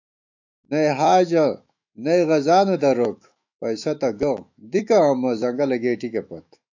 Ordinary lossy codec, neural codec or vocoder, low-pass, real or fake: AAC, 48 kbps; autoencoder, 48 kHz, 128 numbers a frame, DAC-VAE, trained on Japanese speech; 7.2 kHz; fake